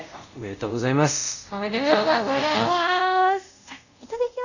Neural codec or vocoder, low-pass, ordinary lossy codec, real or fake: codec, 24 kHz, 0.5 kbps, DualCodec; 7.2 kHz; none; fake